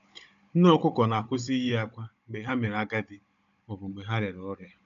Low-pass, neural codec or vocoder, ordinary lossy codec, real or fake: 7.2 kHz; codec, 16 kHz, 16 kbps, FunCodec, trained on Chinese and English, 50 frames a second; none; fake